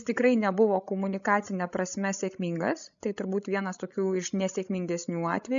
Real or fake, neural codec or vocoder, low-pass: fake; codec, 16 kHz, 16 kbps, FreqCodec, larger model; 7.2 kHz